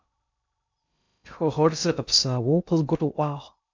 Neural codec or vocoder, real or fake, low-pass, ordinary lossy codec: codec, 16 kHz in and 24 kHz out, 0.6 kbps, FocalCodec, streaming, 2048 codes; fake; 7.2 kHz; MP3, 64 kbps